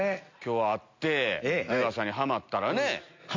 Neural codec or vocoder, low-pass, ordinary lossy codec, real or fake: none; 7.2 kHz; MP3, 48 kbps; real